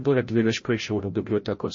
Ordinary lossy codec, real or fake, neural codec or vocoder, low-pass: MP3, 32 kbps; fake; codec, 16 kHz, 0.5 kbps, FreqCodec, larger model; 7.2 kHz